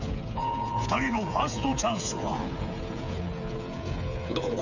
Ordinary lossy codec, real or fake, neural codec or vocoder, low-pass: none; fake; codec, 16 kHz, 8 kbps, FreqCodec, smaller model; 7.2 kHz